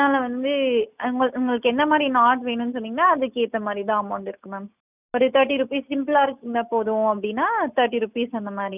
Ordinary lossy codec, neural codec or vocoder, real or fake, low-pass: none; none; real; 3.6 kHz